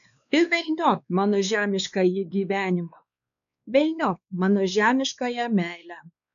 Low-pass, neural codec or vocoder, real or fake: 7.2 kHz; codec, 16 kHz, 2 kbps, X-Codec, WavLM features, trained on Multilingual LibriSpeech; fake